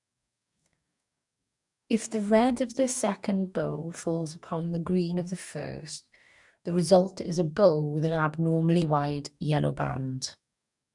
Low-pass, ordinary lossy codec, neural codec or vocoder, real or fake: 10.8 kHz; none; codec, 44.1 kHz, 2.6 kbps, DAC; fake